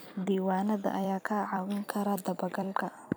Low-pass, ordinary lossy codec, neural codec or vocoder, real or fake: none; none; vocoder, 44.1 kHz, 128 mel bands every 256 samples, BigVGAN v2; fake